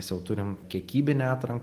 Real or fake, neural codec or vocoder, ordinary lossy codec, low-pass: real; none; Opus, 24 kbps; 14.4 kHz